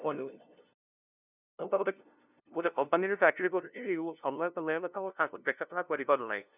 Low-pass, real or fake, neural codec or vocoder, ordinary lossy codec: 3.6 kHz; fake; codec, 16 kHz, 0.5 kbps, FunCodec, trained on LibriTTS, 25 frames a second; none